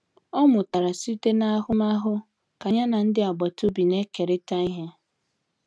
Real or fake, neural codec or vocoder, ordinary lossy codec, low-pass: real; none; none; none